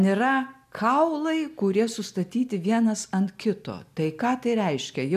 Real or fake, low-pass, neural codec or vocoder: real; 14.4 kHz; none